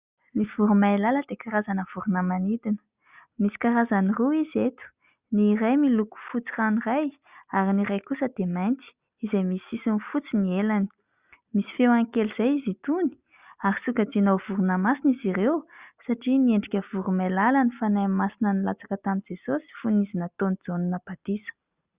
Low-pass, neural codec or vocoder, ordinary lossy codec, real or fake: 3.6 kHz; none; Opus, 64 kbps; real